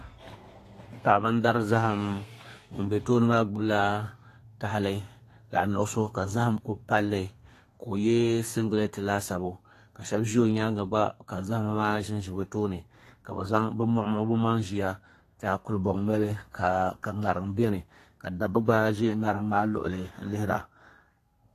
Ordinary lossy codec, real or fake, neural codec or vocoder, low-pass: AAC, 48 kbps; fake; codec, 32 kHz, 1.9 kbps, SNAC; 14.4 kHz